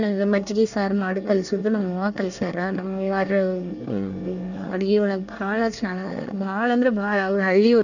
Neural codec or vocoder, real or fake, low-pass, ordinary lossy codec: codec, 24 kHz, 1 kbps, SNAC; fake; 7.2 kHz; none